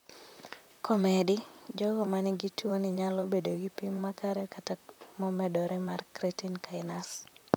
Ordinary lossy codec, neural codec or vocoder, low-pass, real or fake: none; vocoder, 44.1 kHz, 128 mel bands, Pupu-Vocoder; none; fake